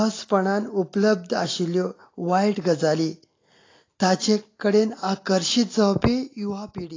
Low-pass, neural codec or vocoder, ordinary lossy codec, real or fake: 7.2 kHz; none; AAC, 32 kbps; real